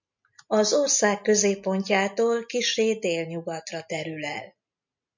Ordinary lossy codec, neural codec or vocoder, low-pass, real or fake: MP3, 64 kbps; none; 7.2 kHz; real